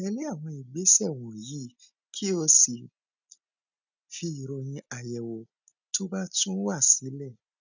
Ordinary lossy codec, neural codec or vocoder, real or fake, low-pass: none; none; real; 7.2 kHz